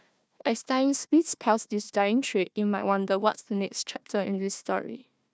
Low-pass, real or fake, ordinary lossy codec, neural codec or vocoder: none; fake; none; codec, 16 kHz, 1 kbps, FunCodec, trained on Chinese and English, 50 frames a second